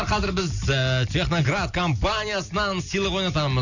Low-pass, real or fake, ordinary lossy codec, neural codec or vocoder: 7.2 kHz; real; none; none